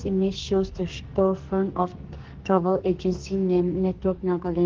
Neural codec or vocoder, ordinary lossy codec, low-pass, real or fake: codec, 44.1 kHz, 2.6 kbps, SNAC; Opus, 16 kbps; 7.2 kHz; fake